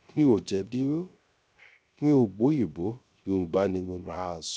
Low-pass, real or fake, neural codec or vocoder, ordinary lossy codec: none; fake; codec, 16 kHz, 0.3 kbps, FocalCodec; none